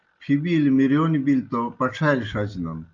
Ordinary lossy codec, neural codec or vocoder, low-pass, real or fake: Opus, 24 kbps; none; 7.2 kHz; real